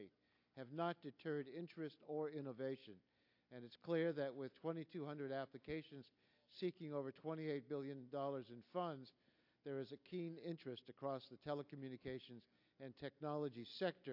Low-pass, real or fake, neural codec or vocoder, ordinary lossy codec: 5.4 kHz; real; none; AAC, 48 kbps